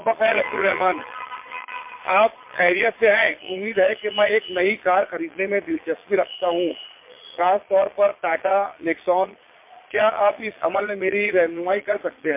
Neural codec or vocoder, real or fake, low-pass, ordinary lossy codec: vocoder, 22.05 kHz, 80 mel bands, Vocos; fake; 3.6 kHz; MP3, 24 kbps